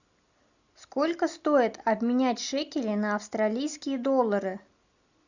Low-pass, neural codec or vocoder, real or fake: 7.2 kHz; none; real